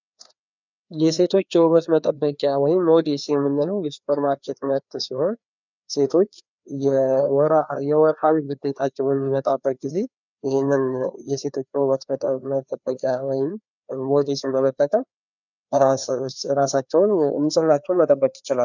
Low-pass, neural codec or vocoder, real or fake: 7.2 kHz; codec, 16 kHz, 2 kbps, FreqCodec, larger model; fake